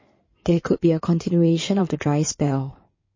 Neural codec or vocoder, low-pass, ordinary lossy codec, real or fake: codec, 16 kHz in and 24 kHz out, 2.2 kbps, FireRedTTS-2 codec; 7.2 kHz; MP3, 32 kbps; fake